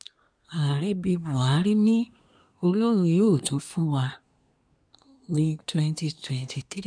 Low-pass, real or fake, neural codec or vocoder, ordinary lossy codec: 9.9 kHz; fake; codec, 24 kHz, 1 kbps, SNAC; none